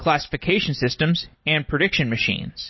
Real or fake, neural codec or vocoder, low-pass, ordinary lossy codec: real; none; 7.2 kHz; MP3, 24 kbps